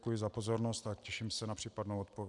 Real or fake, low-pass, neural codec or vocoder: real; 10.8 kHz; none